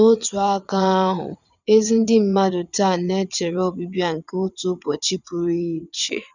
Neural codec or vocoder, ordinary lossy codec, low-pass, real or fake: vocoder, 22.05 kHz, 80 mel bands, WaveNeXt; none; 7.2 kHz; fake